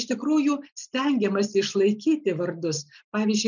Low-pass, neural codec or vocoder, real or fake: 7.2 kHz; none; real